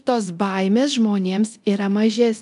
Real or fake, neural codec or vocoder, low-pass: fake; codec, 24 kHz, 0.9 kbps, DualCodec; 10.8 kHz